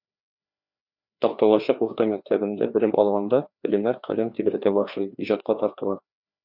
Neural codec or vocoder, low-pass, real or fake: codec, 16 kHz, 2 kbps, FreqCodec, larger model; 5.4 kHz; fake